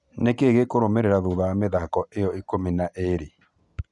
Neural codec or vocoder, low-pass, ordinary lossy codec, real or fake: none; 10.8 kHz; none; real